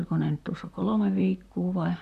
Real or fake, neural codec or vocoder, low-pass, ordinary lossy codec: fake; vocoder, 44.1 kHz, 128 mel bands, Pupu-Vocoder; 14.4 kHz; none